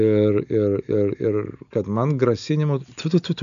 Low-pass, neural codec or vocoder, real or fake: 7.2 kHz; none; real